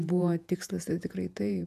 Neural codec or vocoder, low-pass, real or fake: vocoder, 48 kHz, 128 mel bands, Vocos; 14.4 kHz; fake